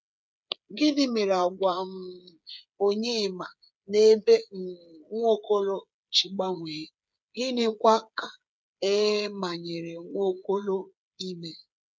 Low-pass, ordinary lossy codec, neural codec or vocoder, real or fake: none; none; codec, 16 kHz, 8 kbps, FreqCodec, smaller model; fake